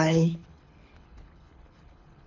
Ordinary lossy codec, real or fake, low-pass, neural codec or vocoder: none; fake; 7.2 kHz; codec, 24 kHz, 6 kbps, HILCodec